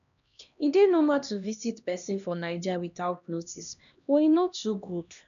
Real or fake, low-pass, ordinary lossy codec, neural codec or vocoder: fake; 7.2 kHz; none; codec, 16 kHz, 1 kbps, X-Codec, HuBERT features, trained on LibriSpeech